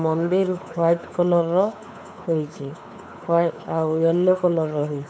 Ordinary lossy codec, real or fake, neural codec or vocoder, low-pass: none; fake; codec, 16 kHz, 4 kbps, X-Codec, WavLM features, trained on Multilingual LibriSpeech; none